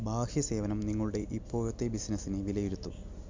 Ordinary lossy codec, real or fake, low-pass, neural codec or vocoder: MP3, 64 kbps; real; 7.2 kHz; none